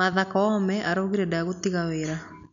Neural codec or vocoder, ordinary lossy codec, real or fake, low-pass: none; none; real; 7.2 kHz